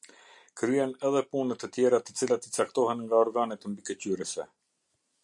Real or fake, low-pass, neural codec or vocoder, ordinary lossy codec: real; 10.8 kHz; none; MP3, 64 kbps